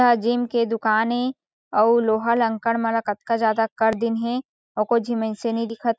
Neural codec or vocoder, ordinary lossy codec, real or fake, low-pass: none; none; real; none